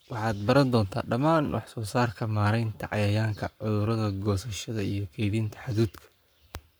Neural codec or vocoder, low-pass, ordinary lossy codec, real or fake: codec, 44.1 kHz, 7.8 kbps, Pupu-Codec; none; none; fake